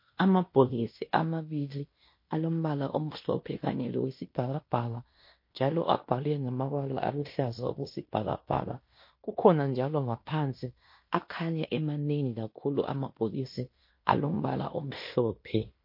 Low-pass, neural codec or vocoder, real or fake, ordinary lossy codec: 5.4 kHz; codec, 16 kHz in and 24 kHz out, 0.9 kbps, LongCat-Audio-Codec, fine tuned four codebook decoder; fake; MP3, 32 kbps